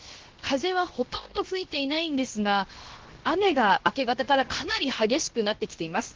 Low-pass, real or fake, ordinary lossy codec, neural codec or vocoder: 7.2 kHz; fake; Opus, 16 kbps; codec, 16 kHz, 0.7 kbps, FocalCodec